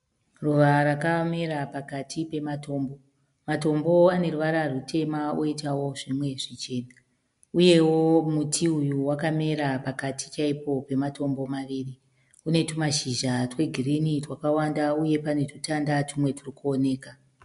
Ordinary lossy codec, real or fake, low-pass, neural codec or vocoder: MP3, 64 kbps; real; 10.8 kHz; none